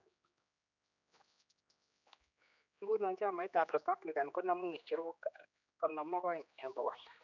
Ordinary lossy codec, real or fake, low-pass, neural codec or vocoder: none; fake; 7.2 kHz; codec, 16 kHz, 2 kbps, X-Codec, HuBERT features, trained on general audio